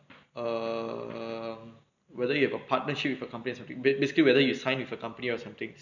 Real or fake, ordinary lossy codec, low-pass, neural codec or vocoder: real; none; 7.2 kHz; none